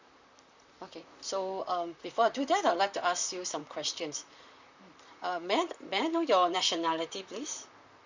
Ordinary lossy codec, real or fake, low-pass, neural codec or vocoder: Opus, 64 kbps; fake; 7.2 kHz; vocoder, 44.1 kHz, 128 mel bands, Pupu-Vocoder